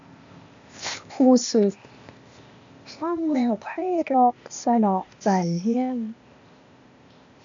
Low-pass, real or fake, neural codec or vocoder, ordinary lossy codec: 7.2 kHz; fake; codec, 16 kHz, 0.8 kbps, ZipCodec; MP3, 64 kbps